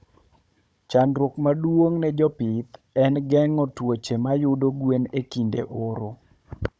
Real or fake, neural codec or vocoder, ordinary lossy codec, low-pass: fake; codec, 16 kHz, 16 kbps, FunCodec, trained on Chinese and English, 50 frames a second; none; none